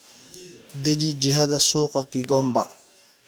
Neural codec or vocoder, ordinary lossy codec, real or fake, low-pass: codec, 44.1 kHz, 2.6 kbps, DAC; none; fake; none